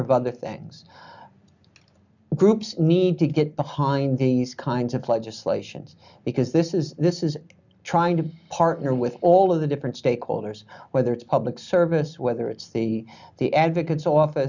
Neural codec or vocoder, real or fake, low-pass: none; real; 7.2 kHz